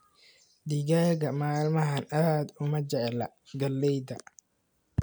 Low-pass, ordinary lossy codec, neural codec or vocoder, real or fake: none; none; none; real